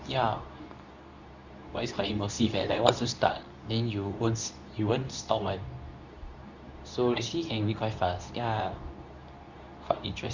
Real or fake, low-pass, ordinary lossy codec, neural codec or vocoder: fake; 7.2 kHz; none; codec, 24 kHz, 0.9 kbps, WavTokenizer, medium speech release version 2